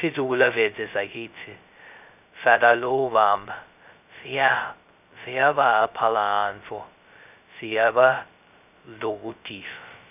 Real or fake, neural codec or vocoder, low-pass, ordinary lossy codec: fake; codec, 16 kHz, 0.2 kbps, FocalCodec; 3.6 kHz; none